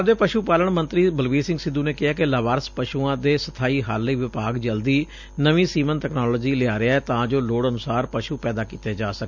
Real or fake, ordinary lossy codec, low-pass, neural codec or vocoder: real; none; 7.2 kHz; none